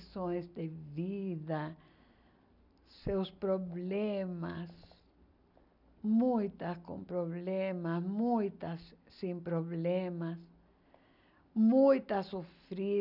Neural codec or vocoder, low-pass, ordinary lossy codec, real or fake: none; 5.4 kHz; none; real